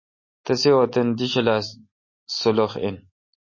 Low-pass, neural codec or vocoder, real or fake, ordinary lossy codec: 7.2 kHz; none; real; MP3, 32 kbps